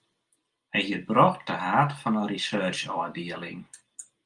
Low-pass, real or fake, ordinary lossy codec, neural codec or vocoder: 10.8 kHz; real; Opus, 32 kbps; none